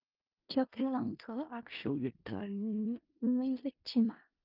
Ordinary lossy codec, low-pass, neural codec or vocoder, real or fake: Opus, 24 kbps; 5.4 kHz; codec, 16 kHz in and 24 kHz out, 0.4 kbps, LongCat-Audio-Codec, four codebook decoder; fake